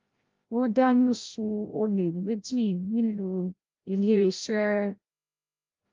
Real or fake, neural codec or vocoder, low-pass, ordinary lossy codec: fake; codec, 16 kHz, 0.5 kbps, FreqCodec, larger model; 7.2 kHz; Opus, 32 kbps